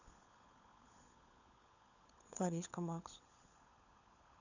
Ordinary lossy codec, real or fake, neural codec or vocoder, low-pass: none; fake; codec, 16 kHz, 16 kbps, FunCodec, trained on LibriTTS, 50 frames a second; 7.2 kHz